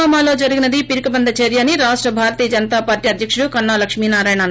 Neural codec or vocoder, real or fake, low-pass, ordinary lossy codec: none; real; none; none